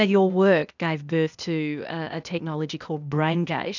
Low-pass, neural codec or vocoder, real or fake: 7.2 kHz; codec, 16 kHz, 0.8 kbps, ZipCodec; fake